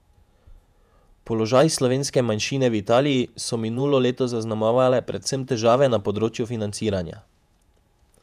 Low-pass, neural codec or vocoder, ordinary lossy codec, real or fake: 14.4 kHz; vocoder, 48 kHz, 128 mel bands, Vocos; none; fake